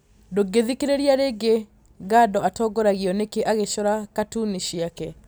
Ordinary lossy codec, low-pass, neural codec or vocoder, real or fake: none; none; none; real